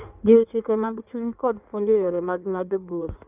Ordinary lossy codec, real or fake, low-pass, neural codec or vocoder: none; fake; 3.6 kHz; codec, 16 kHz in and 24 kHz out, 1.1 kbps, FireRedTTS-2 codec